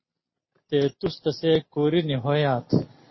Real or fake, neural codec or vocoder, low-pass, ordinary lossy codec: real; none; 7.2 kHz; MP3, 24 kbps